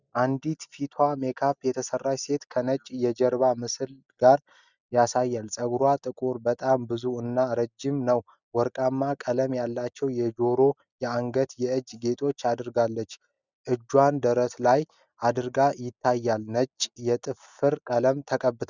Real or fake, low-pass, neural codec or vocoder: real; 7.2 kHz; none